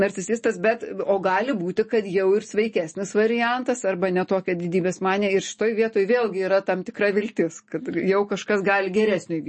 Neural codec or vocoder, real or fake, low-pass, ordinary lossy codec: none; real; 10.8 kHz; MP3, 32 kbps